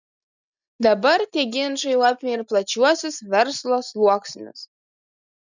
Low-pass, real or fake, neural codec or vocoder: 7.2 kHz; real; none